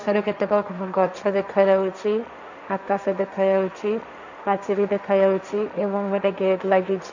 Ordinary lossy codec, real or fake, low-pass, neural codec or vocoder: none; fake; 7.2 kHz; codec, 16 kHz, 1.1 kbps, Voila-Tokenizer